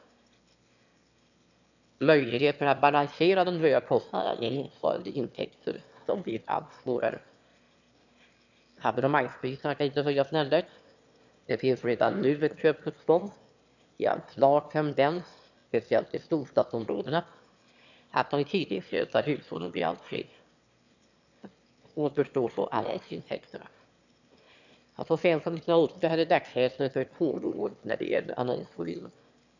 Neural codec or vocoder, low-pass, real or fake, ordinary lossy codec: autoencoder, 22.05 kHz, a latent of 192 numbers a frame, VITS, trained on one speaker; 7.2 kHz; fake; none